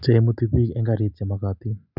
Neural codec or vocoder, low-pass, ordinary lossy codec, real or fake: none; 5.4 kHz; none; real